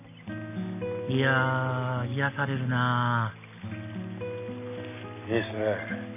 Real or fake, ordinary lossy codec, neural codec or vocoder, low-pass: real; none; none; 3.6 kHz